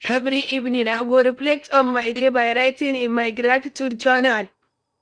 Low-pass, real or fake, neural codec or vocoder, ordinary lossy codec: 9.9 kHz; fake; codec, 16 kHz in and 24 kHz out, 0.8 kbps, FocalCodec, streaming, 65536 codes; none